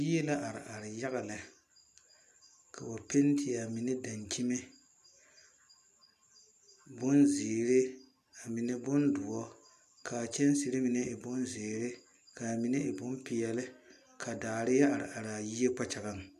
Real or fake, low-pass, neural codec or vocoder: real; 14.4 kHz; none